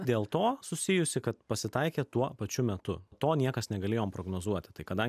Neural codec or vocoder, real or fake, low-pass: none; real; 14.4 kHz